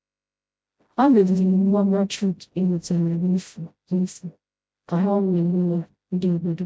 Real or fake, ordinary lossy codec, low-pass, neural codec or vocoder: fake; none; none; codec, 16 kHz, 0.5 kbps, FreqCodec, smaller model